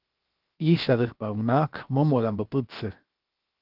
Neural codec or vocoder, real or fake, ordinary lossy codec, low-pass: codec, 16 kHz, 0.7 kbps, FocalCodec; fake; Opus, 32 kbps; 5.4 kHz